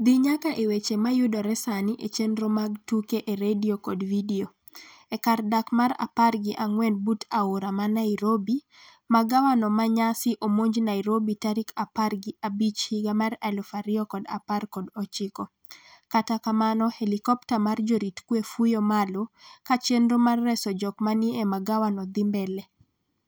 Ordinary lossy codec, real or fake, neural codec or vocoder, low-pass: none; real; none; none